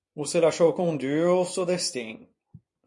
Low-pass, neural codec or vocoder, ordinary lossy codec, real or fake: 10.8 kHz; vocoder, 24 kHz, 100 mel bands, Vocos; MP3, 48 kbps; fake